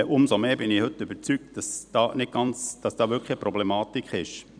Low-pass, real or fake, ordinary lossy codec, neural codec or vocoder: 9.9 kHz; fake; none; vocoder, 44.1 kHz, 128 mel bands every 256 samples, BigVGAN v2